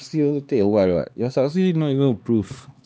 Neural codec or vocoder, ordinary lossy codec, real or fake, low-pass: codec, 16 kHz, 2 kbps, X-Codec, HuBERT features, trained on LibriSpeech; none; fake; none